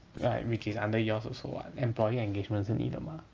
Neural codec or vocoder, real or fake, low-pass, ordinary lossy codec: none; real; 7.2 kHz; Opus, 24 kbps